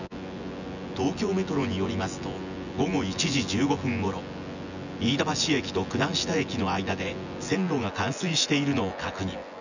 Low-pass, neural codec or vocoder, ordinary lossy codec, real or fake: 7.2 kHz; vocoder, 24 kHz, 100 mel bands, Vocos; none; fake